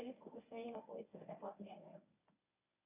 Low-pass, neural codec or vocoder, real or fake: 3.6 kHz; codec, 24 kHz, 0.9 kbps, WavTokenizer, medium speech release version 1; fake